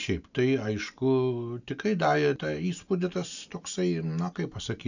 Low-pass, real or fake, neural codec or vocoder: 7.2 kHz; real; none